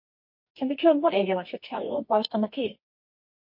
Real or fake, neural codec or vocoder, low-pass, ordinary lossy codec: fake; codec, 24 kHz, 0.9 kbps, WavTokenizer, medium music audio release; 5.4 kHz; MP3, 32 kbps